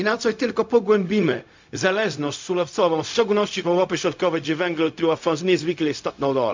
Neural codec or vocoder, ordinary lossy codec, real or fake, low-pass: codec, 16 kHz, 0.4 kbps, LongCat-Audio-Codec; MP3, 64 kbps; fake; 7.2 kHz